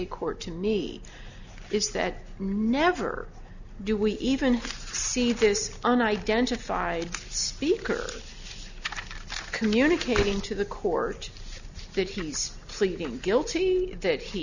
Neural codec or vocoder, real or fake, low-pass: none; real; 7.2 kHz